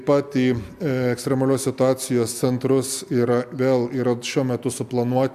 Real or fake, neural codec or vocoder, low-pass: real; none; 14.4 kHz